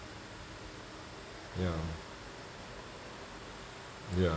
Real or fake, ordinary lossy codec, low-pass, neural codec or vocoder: real; none; none; none